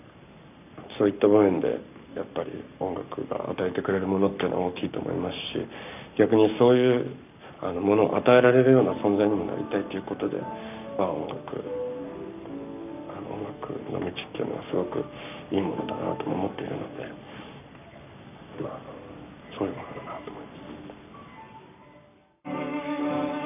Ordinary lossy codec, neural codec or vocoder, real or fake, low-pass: none; codec, 44.1 kHz, 7.8 kbps, Pupu-Codec; fake; 3.6 kHz